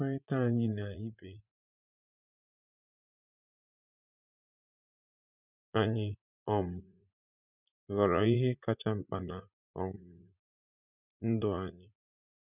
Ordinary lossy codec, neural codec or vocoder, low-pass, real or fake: none; vocoder, 44.1 kHz, 80 mel bands, Vocos; 3.6 kHz; fake